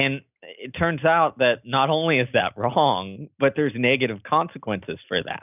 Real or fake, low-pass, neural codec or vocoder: real; 3.6 kHz; none